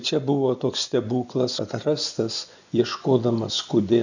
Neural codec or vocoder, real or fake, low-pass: vocoder, 24 kHz, 100 mel bands, Vocos; fake; 7.2 kHz